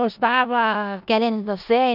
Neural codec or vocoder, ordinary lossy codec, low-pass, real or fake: codec, 16 kHz in and 24 kHz out, 0.4 kbps, LongCat-Audio-Codec, four codebook decoder; none; 5.4 kHz; fake